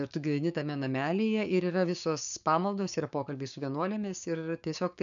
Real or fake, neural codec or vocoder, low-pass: fake; codec, 16 kHz, 6 kbps, DAC; 7.2 kHz